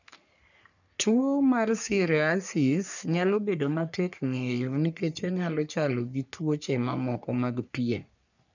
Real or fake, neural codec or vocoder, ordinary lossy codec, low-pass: fake; codec, 44.1 kHz, 3.4 kbps, Pupu-Codec; none; 7.2 kHz